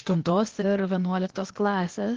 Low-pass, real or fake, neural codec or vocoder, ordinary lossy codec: 7.2 kHz; fake; codec, 16 kHz, 0.8 kbps, ZipCodec; Opus, 16 kbps